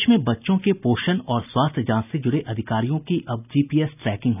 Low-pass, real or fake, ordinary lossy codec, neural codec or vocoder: 3.6 kHz; real; none; none